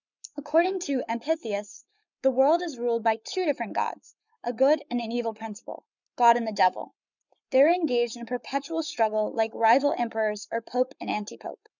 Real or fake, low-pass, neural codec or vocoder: fake; 7.2 kHz; codec, 44.1 kHz, 7.8 kbps, Pupu-Codec